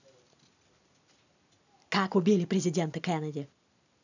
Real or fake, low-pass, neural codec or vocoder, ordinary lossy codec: real; 7.2 kHz; none; none